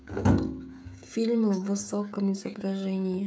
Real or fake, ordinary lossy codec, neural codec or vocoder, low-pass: fake; none; codec, 16 kHz, 16 kbps, FreqCodec, smaller model; none